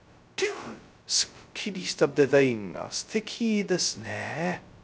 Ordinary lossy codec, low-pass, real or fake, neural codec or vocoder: none; none; fake; codec, 16 kHz, 0.2 kbps, FocalCodec